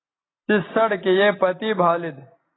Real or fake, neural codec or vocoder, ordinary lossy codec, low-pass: real; none; AAC, 16 kbps; 7.2 kHz